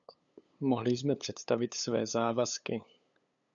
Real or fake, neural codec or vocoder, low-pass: fake; codec, 16 kHz, 8 kbps, FunCodec, trained on LibriTTS, 25 frames a second; 7.2 kHz